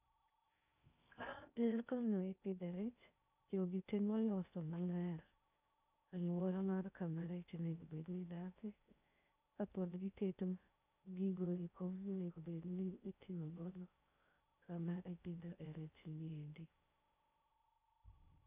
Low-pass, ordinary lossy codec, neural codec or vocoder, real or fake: 3.6 kHz; none; codec, 16 kHz in and 24 kHz out, 0.6 kbps, FocalCodec, streaming, 2048 codes; fake